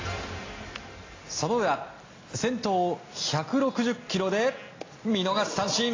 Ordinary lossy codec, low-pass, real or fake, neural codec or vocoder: AAC, 32 kbps; 7.2 kHz; real; none